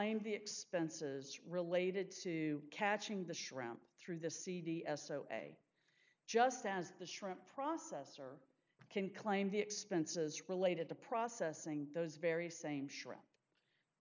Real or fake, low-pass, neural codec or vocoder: real; 7.2 kHz; none